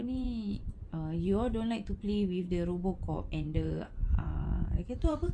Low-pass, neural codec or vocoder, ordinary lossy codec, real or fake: 10.8 kHz; vocoder, 24 kHz, 100 mel bands, Vocos; none; fake